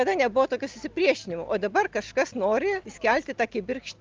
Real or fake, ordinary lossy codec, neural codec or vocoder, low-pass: real; Opus, 32 kbps; none; 7.2 kHz